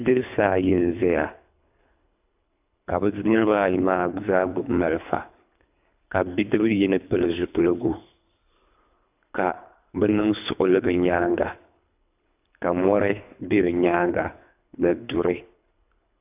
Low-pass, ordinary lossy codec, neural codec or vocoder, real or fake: 3.6 kHz; AAC, 32 kbps; codec, 24 kHz, 3 kbps, HILCodec; fake